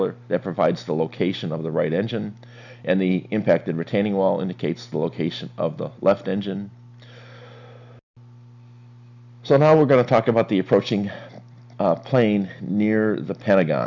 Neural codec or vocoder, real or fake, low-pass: none; real; 7.2 kHz